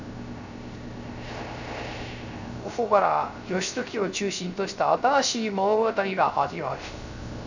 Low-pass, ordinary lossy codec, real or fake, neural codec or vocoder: 7.2 kHz; none; fake; codec, 16 kHz, 0.3 kbps, FocalCodec